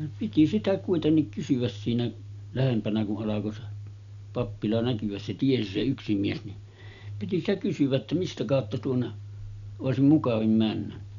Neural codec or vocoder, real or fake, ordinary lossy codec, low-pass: none; real; none; 7.2 kHz